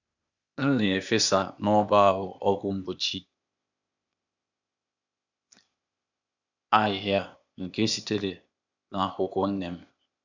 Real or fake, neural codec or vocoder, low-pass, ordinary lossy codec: fake; codec, 16 kHz, 0.8 kbps, ZipCodec; 7.2 kHz; none